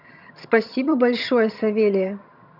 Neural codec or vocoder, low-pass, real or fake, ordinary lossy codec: vocoder, 22.05 kHz, 80 mel bands, HiFi-GAN; 5.4 kHz; fake; none